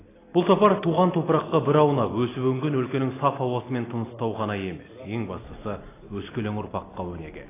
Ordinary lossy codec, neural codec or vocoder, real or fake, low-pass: AAC, 16 kbps; none; real; 3.6 kHz